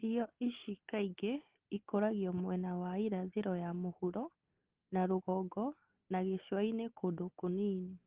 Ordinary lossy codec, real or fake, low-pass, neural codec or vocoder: Opus, 16 kbps; real; 3.6 kHz; none